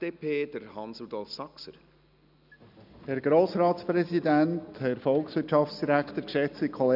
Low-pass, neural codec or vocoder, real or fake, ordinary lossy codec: 5.4 kHz; none; real; none